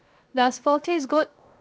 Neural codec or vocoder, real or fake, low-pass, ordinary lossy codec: codec, 16 kHz, 0.7 kbps, FocalCodec; fake; none; none